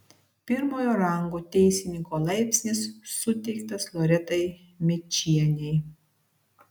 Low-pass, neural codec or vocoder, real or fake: 19.8 kHz; none; real